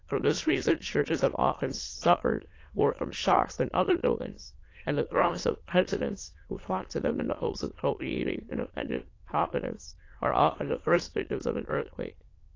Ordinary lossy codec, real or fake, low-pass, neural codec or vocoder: AAC, 32 kbps; fake; 7.2 kHz; autoencoder, 22.05 kHz, a latent of 192 numbers a frame, VITS, trained on many speakers